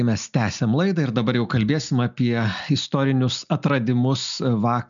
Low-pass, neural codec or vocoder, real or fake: 7.2 kHz; none; real